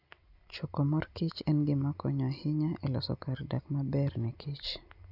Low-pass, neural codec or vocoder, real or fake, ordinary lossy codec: 5.4 kHz; none; real; none